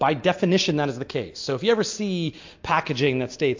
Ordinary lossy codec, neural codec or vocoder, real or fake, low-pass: MP3, 48 kbps; none; real; 7.2 kHz